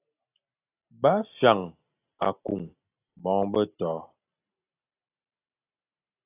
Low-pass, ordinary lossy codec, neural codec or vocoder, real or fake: 3.6 kHz; AAC, 24 kbps; none; real